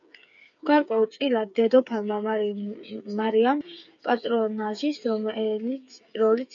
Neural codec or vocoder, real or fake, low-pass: codec, 16 kHz, 8 kbps, FreqCodec, smaller model; fake; 7.2 kHz